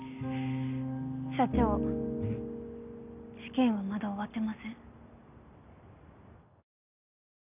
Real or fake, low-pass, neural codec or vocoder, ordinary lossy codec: real; 3.6 kHz; none; none